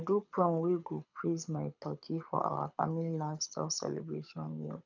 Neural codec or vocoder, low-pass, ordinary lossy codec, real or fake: codec, 24 kHz, 6 kbps, HILCodec; 7.2 kHz; none; fake